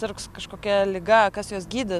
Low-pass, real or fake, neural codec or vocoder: 14.4 kHz; real; none